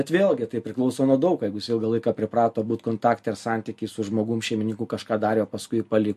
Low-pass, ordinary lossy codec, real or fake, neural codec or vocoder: 14.4 kHz; MP3, 64 kbps; real; none